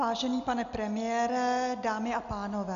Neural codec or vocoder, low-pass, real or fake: none; 7.2 kHz; real